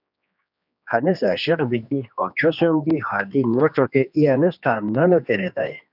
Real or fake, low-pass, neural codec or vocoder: fake; 5.4 kHz; codec, 16 kHz, 2 kbps, X-Codec, HuBERT features, trained on general audio